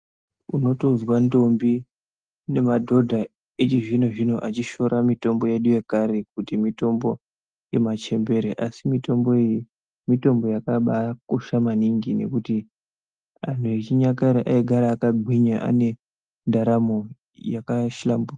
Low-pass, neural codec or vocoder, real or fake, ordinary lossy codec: 9.9 kHz; none; real; Opus, 32 kbps